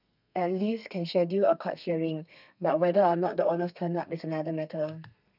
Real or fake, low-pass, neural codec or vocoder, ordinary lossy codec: fake; 5.4 kHz; codec, 32 kHz, 1.9 kbps, SNAC; none